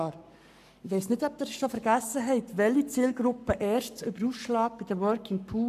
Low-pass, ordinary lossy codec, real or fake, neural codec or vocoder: 14.4 kHz; none; fake; codec, 44.1 kHz, 7.8 kbps, Pupu-Codec